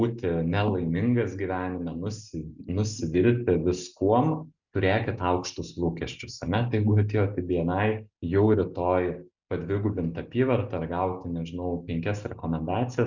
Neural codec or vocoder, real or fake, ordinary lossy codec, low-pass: none; real; Opus, 64 kbps; 7.2 kHz